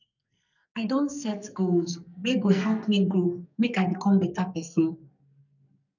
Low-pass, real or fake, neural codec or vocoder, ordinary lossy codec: 7.2 kHz; fake; codec, 44.1 kHz, 2.6 kbps, SNAC; none